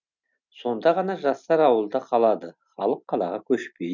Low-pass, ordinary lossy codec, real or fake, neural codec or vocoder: 7.2 kHz; none; real; none